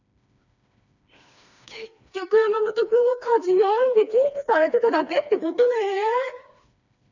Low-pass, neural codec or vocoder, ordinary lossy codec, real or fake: 7.2 kHz; codec, 16 kHz, 2 kbps, FreqCodec, smaller model; none; fake